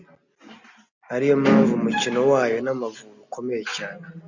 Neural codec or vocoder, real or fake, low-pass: none; real; 7.2 kHz